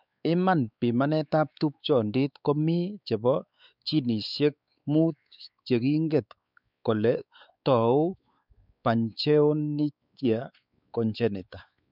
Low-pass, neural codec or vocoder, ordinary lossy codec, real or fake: 5.4 kHz; codec, 16 kHz, 4 kbps, X-Codec, WavLM features, trained on Multilingual LibriSpeech; none; fake